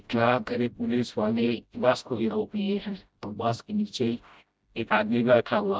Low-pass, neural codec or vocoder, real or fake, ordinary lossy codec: none; codec, 16 kHz, 0.5 kbps, FreqCodec, smaller model; fake; none